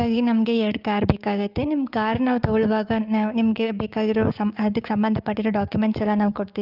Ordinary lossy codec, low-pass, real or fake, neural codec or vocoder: Opus, 24 kbps; 5.4 kHz; fake; codec, 16 kHz, 6 kbps, DAC